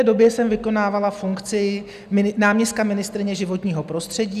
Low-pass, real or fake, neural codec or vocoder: 14.4 kHz; real; none